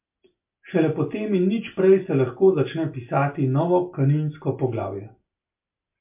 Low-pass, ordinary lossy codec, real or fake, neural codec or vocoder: 3.6 kHz; MP3, 32 kbps; real; none